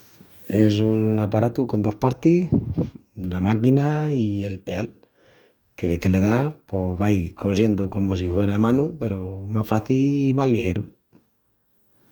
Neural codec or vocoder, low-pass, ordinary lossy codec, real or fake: codec, 44.1 kHz, 2.6 kbps, DAC; none; none; fake